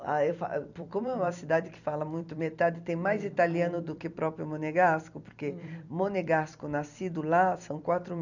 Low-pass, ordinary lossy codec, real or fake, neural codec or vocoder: 7.2 kHz; none; fake; vocoder, 44.1 kHz, 128 mel bands every 256 samples, BigVGAN v2